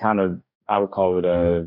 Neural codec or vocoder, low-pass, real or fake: codec, 44.1 kHz, 2.6 kbps, DAC; 5.4 kHz; fake